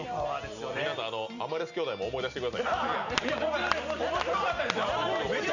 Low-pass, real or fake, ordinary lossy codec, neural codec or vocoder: 7.2 kHz; real; none; none